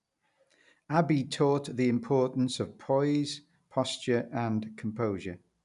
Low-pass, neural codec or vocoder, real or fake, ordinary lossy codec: 10.8 kHz; none; real; none